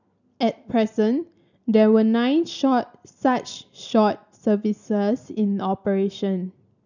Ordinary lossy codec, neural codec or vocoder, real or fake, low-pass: none; none; real; 7.2 kHz